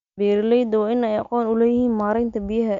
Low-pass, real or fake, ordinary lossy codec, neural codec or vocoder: 7.2 kHz; real; Opus, 64 kbps; none